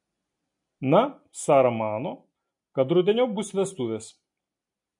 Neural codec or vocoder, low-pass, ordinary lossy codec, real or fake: none; 10.8 kHz; MP3, 48 kbps; real